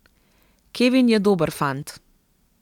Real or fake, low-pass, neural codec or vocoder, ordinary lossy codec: real; 19.8 kHz; none; none